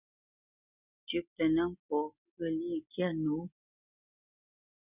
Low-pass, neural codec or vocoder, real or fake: 3.6 kHz; none; real